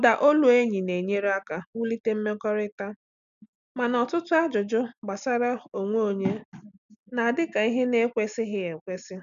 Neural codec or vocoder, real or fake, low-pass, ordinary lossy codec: none; real; 7.2 kHz; none